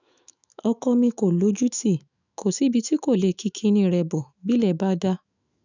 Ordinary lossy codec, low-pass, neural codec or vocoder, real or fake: none; 7.2 kHz; autoencoder, 48 kHz, 128 numbers a frame, DAC-VAE, trained on Japanese speech; fake